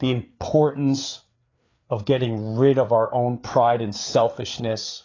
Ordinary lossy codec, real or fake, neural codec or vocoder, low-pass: AAC, 32 kbps; fake; codec, 16 kHz, 4 kbps, FreqCodec, larger model; 7.2 kHz